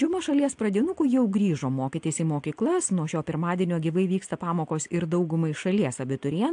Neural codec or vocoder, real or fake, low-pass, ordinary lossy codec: none; real; 9.9 kHz; AAC, 64 kbps